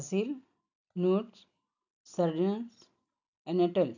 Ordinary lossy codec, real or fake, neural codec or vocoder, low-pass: none; real; none; 7.2 kHz